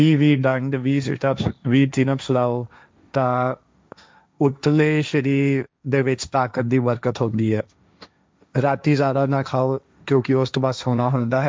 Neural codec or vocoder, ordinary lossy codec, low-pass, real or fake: codec, 16 kHz, 1.1 kbps, Voila-Tokenizer; none; none; fake